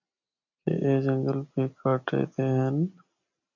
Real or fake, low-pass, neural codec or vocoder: real; 7.2 kHz; none